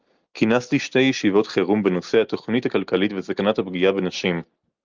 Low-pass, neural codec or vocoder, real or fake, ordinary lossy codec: 7.2 kHz; none; real; Opus, 24 kbps